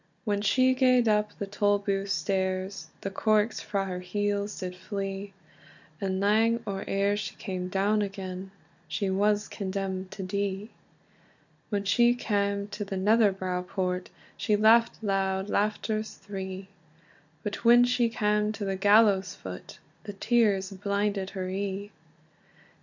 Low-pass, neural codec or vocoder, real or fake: 7.2 kHz; none; real